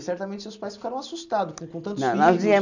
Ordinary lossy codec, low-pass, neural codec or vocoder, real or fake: none; 7.2 kHz; none; real